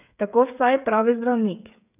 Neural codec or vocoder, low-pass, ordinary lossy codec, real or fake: codec, 16 kHz, 8 kbps, FreqCodec, smaller model; 3.6 kHz; none; fake